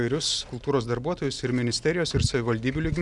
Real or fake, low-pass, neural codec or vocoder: real; 10.8 kHz; none